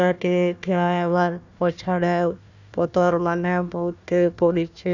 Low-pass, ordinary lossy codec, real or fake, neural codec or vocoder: 7.2 kHz; none; fake; codec, 16 kHz, 1 kbps, FunCodec, trained on Chinese and English, 50 frames a second